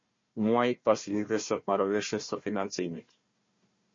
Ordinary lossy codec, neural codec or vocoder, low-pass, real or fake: MP3, 32 kbps; codec, 16 kHz, 1 kbps, FunCodec, trained on Chinese and English, 50 frames a second; 7.2 kHz; fake